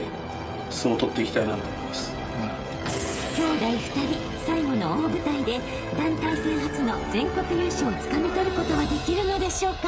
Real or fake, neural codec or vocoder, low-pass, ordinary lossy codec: fake; codec, 16 kHz, 16 kbps, FreqCodec, smaller model; none; none